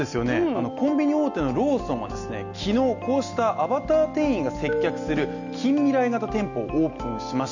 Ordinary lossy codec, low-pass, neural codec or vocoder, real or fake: none; 7.2 kHz; none; real